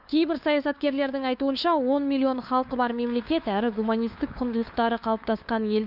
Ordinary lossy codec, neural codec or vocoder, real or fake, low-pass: none; codec, 16 kHz, 2 kbps, FunCodec, trained on LibriTTS, 25 frames a second; fake; 5.4 kHz